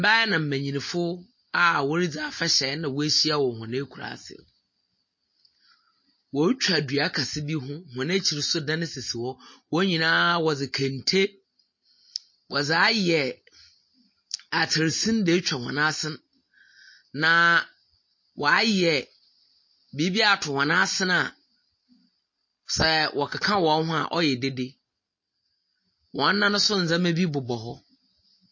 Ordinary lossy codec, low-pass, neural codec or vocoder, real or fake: MP3, 32 kbps; 7.2 kHz; none; real